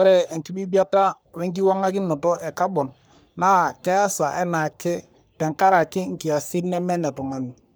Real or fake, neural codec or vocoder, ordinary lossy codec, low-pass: fake; codec, 44.1 kHz, 3.4 kbps, Pupu-Codec; none; none